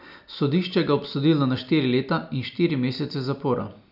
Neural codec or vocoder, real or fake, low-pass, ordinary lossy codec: none; real; 5.4 kHz; none